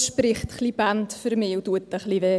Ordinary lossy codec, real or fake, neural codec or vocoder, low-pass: none; real; none; none